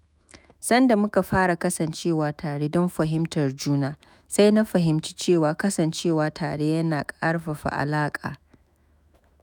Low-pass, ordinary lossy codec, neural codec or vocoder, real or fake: none; none; autoencoder, 48 kHz, 128 numbers a frame, DAC-VAE, trained on Japanese speech; fake